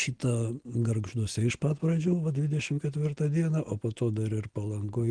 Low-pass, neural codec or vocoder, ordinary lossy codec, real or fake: 9.9 kHz; none; Opus, 16 kbps; real